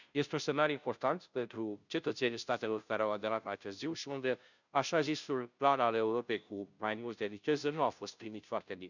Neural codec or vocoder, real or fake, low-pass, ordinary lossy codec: codec, 16 kHz, 0.5 kbps, FunCodec, trained on Chinese and English, 25 frames a second; fake; 7.2 kHz; none